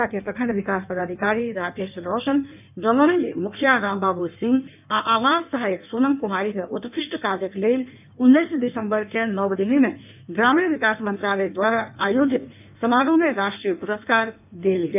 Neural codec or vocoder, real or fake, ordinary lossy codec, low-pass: codec, 16 kHz in and 24 kHz out, 1.1 kbps, FireRedTTS-2 codec; fake; none; 3.6 kHz